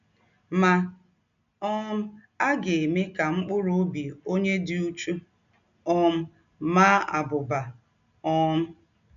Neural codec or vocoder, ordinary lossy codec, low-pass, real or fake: none; none; 7.2 kHz; real